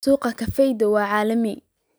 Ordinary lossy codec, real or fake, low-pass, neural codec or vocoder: none; real; none; none